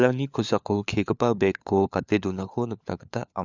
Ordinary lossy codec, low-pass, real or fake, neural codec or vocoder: none; 7.2 kHz; fake; codec, 16 kHz, 4 kbps, FunCodec, trained on LibriTTS, 50 frames a second